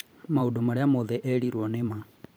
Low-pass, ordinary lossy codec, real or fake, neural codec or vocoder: none; none; fake; vocoder, 44.1 kHz, 128 mel bands every 512 samples, BigVGAN v2